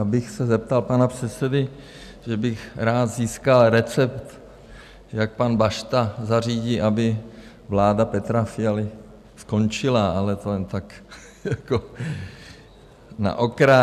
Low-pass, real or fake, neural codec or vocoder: 14.4 kHz; real; none